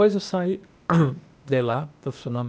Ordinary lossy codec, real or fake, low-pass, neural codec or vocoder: none; fake; none; codec, 16 kHz, 0.8 kbps, ZipCodec